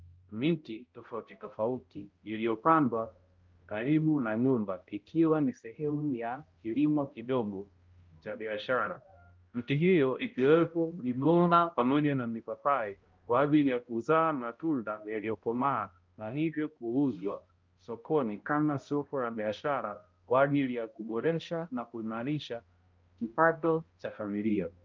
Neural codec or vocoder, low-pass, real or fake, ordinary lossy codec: codec, 16 kHz, 0.5 kbps, X-Codec, HuBERT features, trained on balanced general audio; 7.2 kHz; fake; Opus, 32 kbps